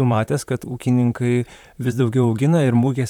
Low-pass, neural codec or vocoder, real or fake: 19.8 kHz; vocoder, 44.1 kHz, 128 mel bands, Pupu-Vocoder; fake